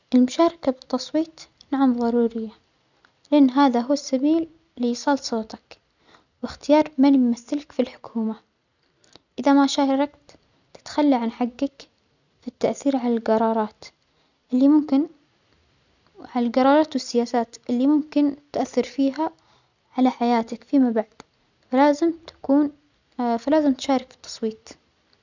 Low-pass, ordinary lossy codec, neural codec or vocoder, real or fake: 7.2 kHz; none; none; real